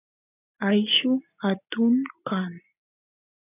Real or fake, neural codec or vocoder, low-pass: real; none; 3.6 kHz